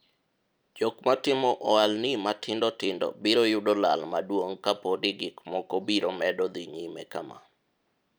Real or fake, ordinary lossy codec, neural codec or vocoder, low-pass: real; none; none; none